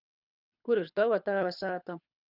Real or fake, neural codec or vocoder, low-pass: fake; codec, 24 kHz, 6 kbps, HILCodec; 5.4 kHz